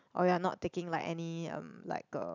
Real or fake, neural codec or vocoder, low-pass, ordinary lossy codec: real; none; 7.2 kHz; none